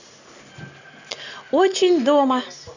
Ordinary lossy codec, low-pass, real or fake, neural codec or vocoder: none; 7.2 kHz; real; none